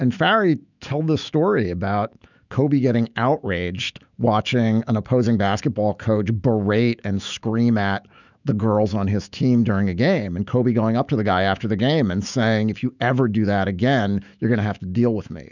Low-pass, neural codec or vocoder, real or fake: 7.2 kHz; autoencoder, 48 kHz, 128 numbers a frame, DAC-VAE, trained on Japanese speech; fake